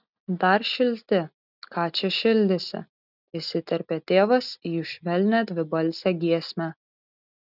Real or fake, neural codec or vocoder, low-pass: real; none; 5.4 kHz